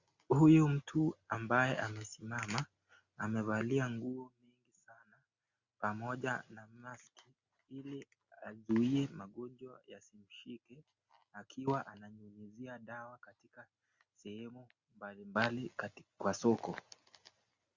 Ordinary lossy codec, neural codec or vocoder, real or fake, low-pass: Opus, 64 kbps; none; real; 7.2 kHz